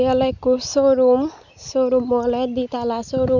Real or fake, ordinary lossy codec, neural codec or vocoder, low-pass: real; none; none; 7.2 kHz